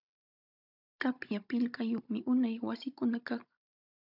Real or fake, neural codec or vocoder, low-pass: fake; codec, 16 kHz, 16 kbps, FunCodec, trained on Chinese and English, 50 frames a second; 5.4 kHz